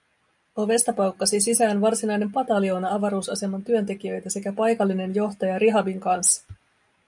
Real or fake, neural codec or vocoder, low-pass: real; none; 10.8 kHz